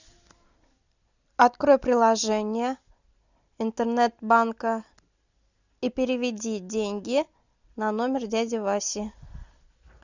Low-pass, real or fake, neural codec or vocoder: 7.2 kHz; real; none